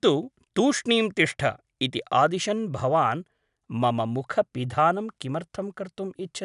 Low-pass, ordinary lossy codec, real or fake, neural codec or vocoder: 10.8 kHz; none; real; none